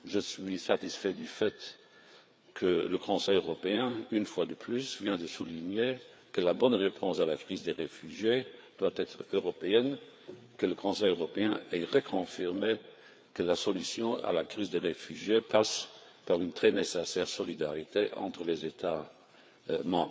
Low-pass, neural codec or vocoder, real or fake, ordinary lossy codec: none; codec, 16 kHz, 4 kbps, FreqCodec, larger model; fake; none